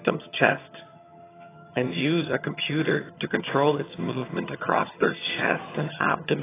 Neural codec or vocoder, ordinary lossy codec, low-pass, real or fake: vocoder, 22.05 kHz, 80 mel bands, HiFi-GAN; AAC, 16 kbps; 3.6 kHz; fake